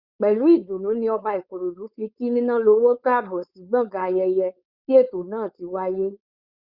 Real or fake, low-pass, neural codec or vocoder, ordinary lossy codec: fake; 5.4 kHz; codec, 16 kHz, 4.8 kbps, FACodec; Opus, 64 kbps